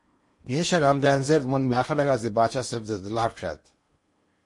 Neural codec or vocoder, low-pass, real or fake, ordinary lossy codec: codec, 16 kHz in and 24 kHz out, 0.8 kbps, FocalCodec, streaming, 65536 codes; 10.8 kHz; fake; MP3, 48 kbps